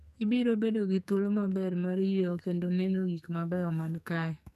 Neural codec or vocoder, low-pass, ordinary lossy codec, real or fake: codec, 44.1 kHz, 2.6 kbps, SNAC; 14.4 kHz; none; fake